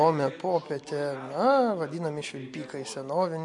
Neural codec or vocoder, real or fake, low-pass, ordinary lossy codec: none; real; 10.8 kHz; MP3, 64 kbps